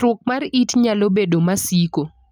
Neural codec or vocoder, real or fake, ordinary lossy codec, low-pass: none; real; none; none